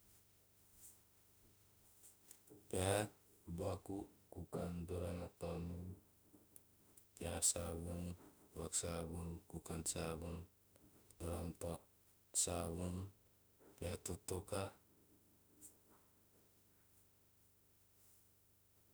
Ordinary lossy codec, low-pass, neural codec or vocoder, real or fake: none; none; autoencoder, 48 kHz, 32 numbers a frame, DAC-VAE, trained on Japanese speech; fake